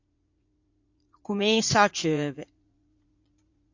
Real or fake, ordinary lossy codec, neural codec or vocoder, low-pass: fake; AAC, 48 kbps; vocoder, 44.1 kHz, 80 mel bands, Vocos; 7.2 kHz